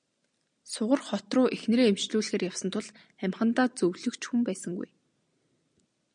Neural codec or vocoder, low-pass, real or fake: none; 9.9 kHz; real